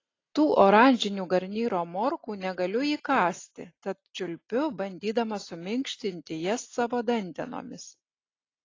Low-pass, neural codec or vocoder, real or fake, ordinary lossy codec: 7.2 kHz; none; real; AAC, 32 kbps